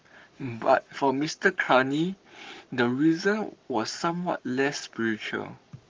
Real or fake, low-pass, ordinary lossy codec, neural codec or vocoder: fake; 7.2 kHz; Opus, 32 kbps; codec, 44.1 kHz, 7.8 kbps, Pupu-Codec